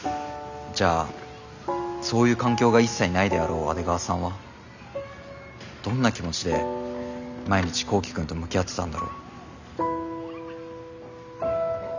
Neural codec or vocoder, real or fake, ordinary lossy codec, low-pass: none; real; none; 7.2 kHz